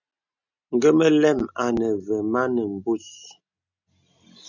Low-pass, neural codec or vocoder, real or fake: 7.2 kHz; none; real